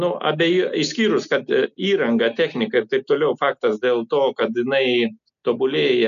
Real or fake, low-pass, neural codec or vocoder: real; 7.2 kHz; none